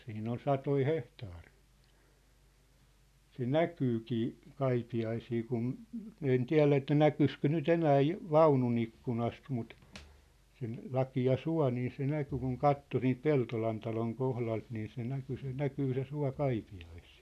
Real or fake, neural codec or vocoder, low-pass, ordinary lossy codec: real; none; 14.4 kHz; none